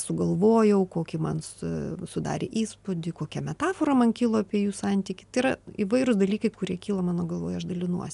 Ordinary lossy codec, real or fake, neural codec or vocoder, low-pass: MP3, 96 kbps; real; none; 10.8 kHz